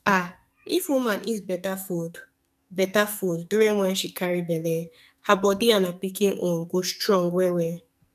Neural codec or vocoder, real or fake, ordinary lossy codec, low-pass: codec, 44.1 kHz, 2.6 kbps, SNAC; fake; none; 14.4 kHz